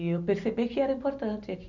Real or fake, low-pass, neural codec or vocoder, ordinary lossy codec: real; 7.2 kHz; none; none